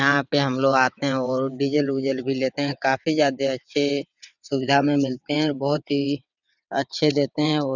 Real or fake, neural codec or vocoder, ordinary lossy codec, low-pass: fake; vocoder, 22.05 kHz, 80 mel bands, WaveNeXt; none; 7.2 kHz